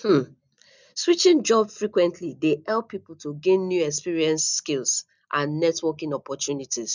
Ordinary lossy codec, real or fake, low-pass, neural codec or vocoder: none; real; 7.2 kHz; none